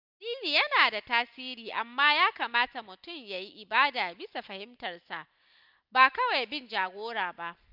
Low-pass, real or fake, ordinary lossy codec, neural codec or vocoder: 5.4 kHz; real; none; none